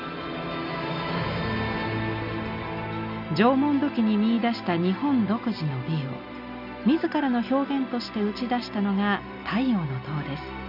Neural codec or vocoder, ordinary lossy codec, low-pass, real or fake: none; none; 5.4 kHz; real